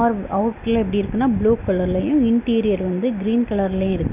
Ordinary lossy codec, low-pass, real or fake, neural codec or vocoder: none; 3.6 kHz; real; none